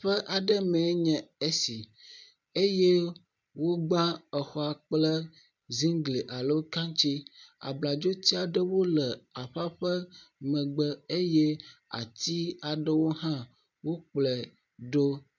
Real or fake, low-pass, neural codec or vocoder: real; 7.2 kHz; none